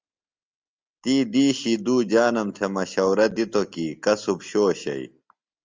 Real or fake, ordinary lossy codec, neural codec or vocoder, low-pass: real; Opus, 32 kbps; none; 7.2 kHz